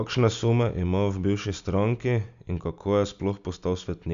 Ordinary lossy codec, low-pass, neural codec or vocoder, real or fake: Opus, 64 kbps; 7.2 kHz; none; real